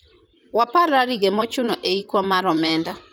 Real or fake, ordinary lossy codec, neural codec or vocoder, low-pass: fake; none; vocoder, 44.1 kHz, 128 mel bands, Pupu-Vocoder; none